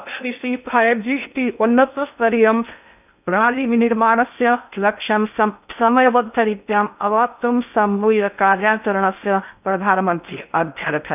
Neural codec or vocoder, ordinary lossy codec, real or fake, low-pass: codec, 16 kHz in and 24 kHz out, 0.6 kbps, FocalCodec, streaming, 2048 codes; none; fake; 3.6 kHz